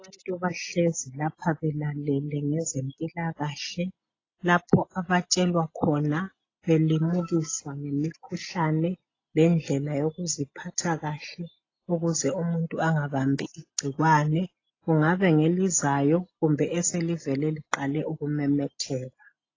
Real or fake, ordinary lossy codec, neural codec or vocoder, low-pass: real; AAC, 32 kbps; none; 7.2 kHz